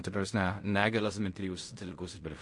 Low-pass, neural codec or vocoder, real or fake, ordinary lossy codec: 10.8 kHz; codec, 16 kHz in and 24 kHz out, 0.4 kbps, LongCat-Audio-Codec, fine tuned four codebook decoder; fake; MP3, 48 kbps